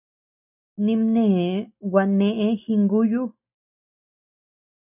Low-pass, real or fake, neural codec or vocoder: 3.6 kHz; real; none